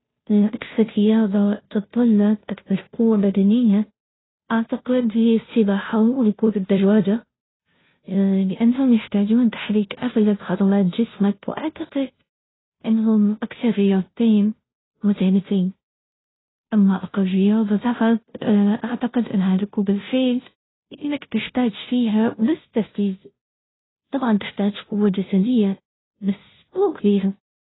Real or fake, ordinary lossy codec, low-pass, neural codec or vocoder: fake; AAC, 16 kbps; 7.2 kHz; codec, 16 kHz, 0.5 kbps, FunCodec, trained on Chinese and English, 25 frames a second